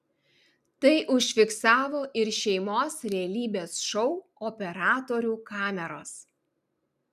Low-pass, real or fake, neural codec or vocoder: 14.4 kHz; real; none